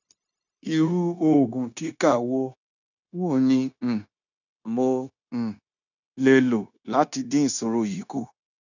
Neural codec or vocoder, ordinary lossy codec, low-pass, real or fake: codec, 16 kHz, 0.9 kbps, LongCat-Audio-Codec; none; 7.2 kHz; fake